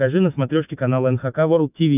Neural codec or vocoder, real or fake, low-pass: autoencoder, 48 kHz, 128 numbers a frame, DAC-VAE, trained on Japanese speech; fake; 3.6 kHz